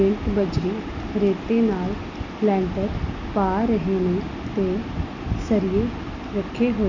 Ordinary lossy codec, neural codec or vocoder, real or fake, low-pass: none; none; real; 7.2 kHz